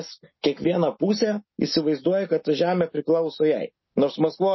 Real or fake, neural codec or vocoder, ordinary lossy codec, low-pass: real; none; MP3, 24 kbps; 7.2 kHz